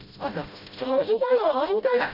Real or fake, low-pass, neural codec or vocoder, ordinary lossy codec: fake; 5.4 kHz; codec, 16 kHz, 0.5 kbps, FreqCodec, smaller model; none